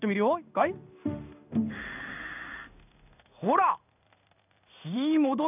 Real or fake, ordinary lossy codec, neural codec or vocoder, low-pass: fake; none; codec, 16 kHz in and 24 kHz out, 1 kbps, XY-Tokenizer; 3.6 kHz